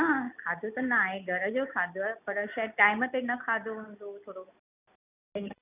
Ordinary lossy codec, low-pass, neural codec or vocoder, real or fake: none; 3.6 kHz; none; real